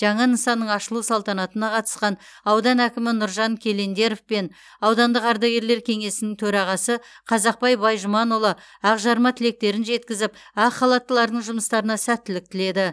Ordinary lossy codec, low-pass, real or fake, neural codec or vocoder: none; none; real; none